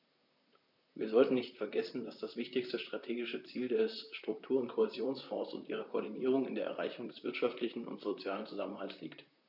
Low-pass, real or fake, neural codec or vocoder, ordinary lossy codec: 5.4 kHz; fake; vocoder, 44.1 kHz, 128 mel bands, Pupu-Vocoder; none